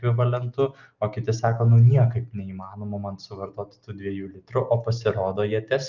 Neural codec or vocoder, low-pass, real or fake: none; 7.2 kHz; real